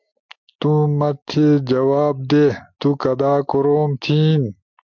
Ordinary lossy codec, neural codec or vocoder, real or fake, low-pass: MP3, 64 kbps; none; real; 7.2 kHz